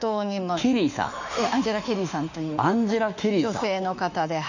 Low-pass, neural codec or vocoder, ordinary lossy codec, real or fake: 7.2 kHz; autoencoder, 48 kHz, 32 numbers a frame, DAC-VAE, trained on Japanese speech; none; fake